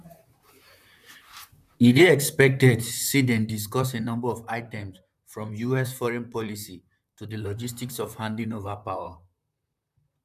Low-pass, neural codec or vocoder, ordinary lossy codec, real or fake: 14.4 kHz; vocoder, 44.1 kHz, 128 mel bands, Pupu-Vocoder; none; fake